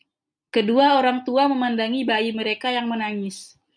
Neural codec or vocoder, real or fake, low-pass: none; real; 9.9 kHz